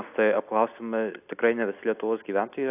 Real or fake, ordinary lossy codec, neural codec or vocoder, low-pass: real; AAC, 32 kbps; none; 3.6 kHz